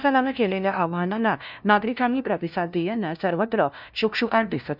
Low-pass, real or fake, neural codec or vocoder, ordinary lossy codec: 5.4 kHz; fake; codec, 16 kHz, 0.5 kbps, FunCodec, trained on LibriTTS, 25 frames a second; none